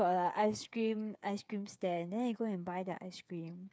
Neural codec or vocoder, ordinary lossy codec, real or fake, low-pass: codec, 16 kHz, 8 kbps, FreqCodec, smaller model; none; fake; none